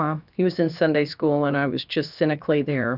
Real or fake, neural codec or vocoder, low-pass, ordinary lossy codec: fake; codec, 16 kHz, about 1 kbps, DyCAST, with the encoder's durations; 5.4 kHz; Opus, 64 kbps